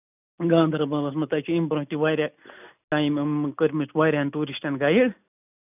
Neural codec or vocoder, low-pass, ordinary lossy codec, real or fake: none; 3.6 kHz; none; real